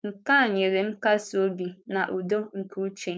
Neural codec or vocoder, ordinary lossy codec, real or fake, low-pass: codec, 16 kHz, 4.8 kbps, FACodec; none; fake; none